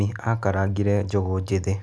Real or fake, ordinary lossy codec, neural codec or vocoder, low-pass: real; none; none; none